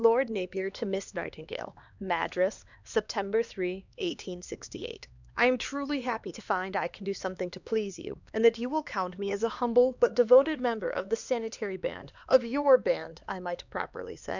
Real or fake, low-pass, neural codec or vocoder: fake; 7.2 kHz; codec, 16 kHz, 2 kbps, X-Codec, HuBERT features, trained on LibriSpeech